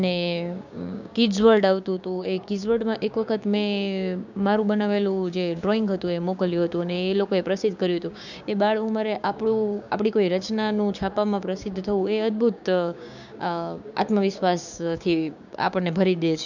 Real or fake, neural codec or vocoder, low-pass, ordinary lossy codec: fake; codec, 16 kHz, 6 kbps, DAC; 7.2 kHz; none